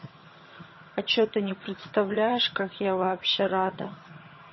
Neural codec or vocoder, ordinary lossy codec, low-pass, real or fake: vocoder, 22.05 kHz, 80 mel bands, HiFi-GAN; MP3, 24 kbps; 7.2 kHz; fake